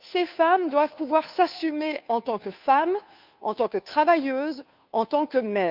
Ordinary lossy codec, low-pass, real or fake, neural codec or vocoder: none; 5.4 kHz; fake; codec, 16 kHz, 2 kbps, FunCodec, trained on Chinese and English, 25 frames a second